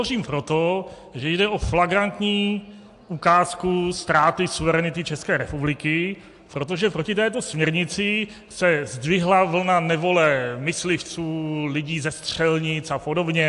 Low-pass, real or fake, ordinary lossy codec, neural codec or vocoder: 10.8 kHz; real; AAC, 64 kbps; none